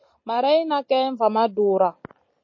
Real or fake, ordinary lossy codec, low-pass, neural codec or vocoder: real; MP3, 32 kbps; 7.2 kHz; none